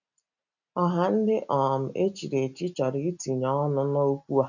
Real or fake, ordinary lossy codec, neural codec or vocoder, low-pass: real; none; none; 7.2 kHz